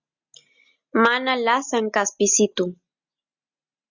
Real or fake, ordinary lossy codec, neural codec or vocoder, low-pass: real; Opus, 64 kbps; none; 7.2 kHz